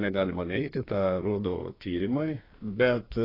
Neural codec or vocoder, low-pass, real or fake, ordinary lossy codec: codec, 32 kHz, 1.9 kbps, SNAC; 5.4 kHz; fake; AAC, 24 kbps